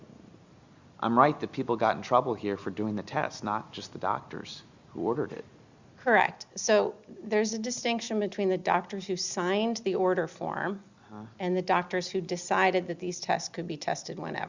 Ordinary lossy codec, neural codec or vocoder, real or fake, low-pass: Opus, 64 kbps; none; real; 7.2 kHz